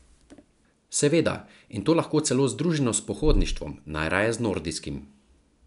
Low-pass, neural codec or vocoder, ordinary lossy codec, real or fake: 10.8 kHz; none; none; real